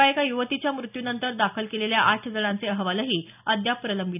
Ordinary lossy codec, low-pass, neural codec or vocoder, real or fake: none; 3.6 kHz; none; real